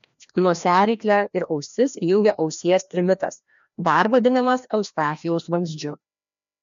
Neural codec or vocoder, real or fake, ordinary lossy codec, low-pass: codec, 16 kHz, 1 kbps, FreqCodec, larger model; fake; MP3, 64 kbps; 7.2 kHz